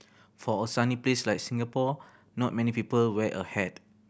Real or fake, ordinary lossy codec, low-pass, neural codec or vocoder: real; none; none; none